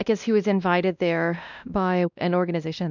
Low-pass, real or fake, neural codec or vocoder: 7.2 kHz; fake; codec, 16 kHz, 1 kbps, X-Codec, WavLM features, trained on Multilingual LibriSpeech